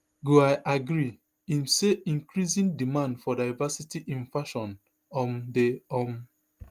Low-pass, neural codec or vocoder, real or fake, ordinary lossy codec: 14.4 kHz; none; real; Opus, 32 kbps